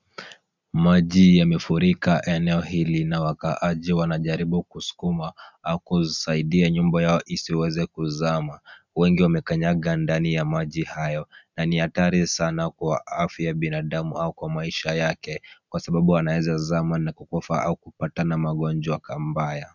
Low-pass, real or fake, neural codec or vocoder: 7.2 kHz; real; none